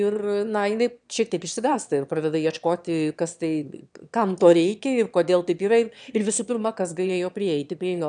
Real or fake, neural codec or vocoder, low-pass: fake; autoencoder, 22.05 kHz, a latent of 192 numbers a frame, VITS, trained on one speaker; 9.9 kHz